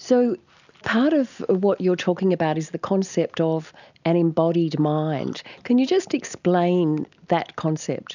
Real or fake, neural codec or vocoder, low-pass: real; none; 7.2 kHz